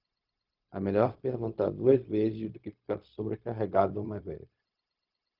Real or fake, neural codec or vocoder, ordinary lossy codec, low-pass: fake; codec, 16 kHz, 0.4 kbps, LongCat-Audio-Codec; Opus, 32 kbps; 5.4 kHz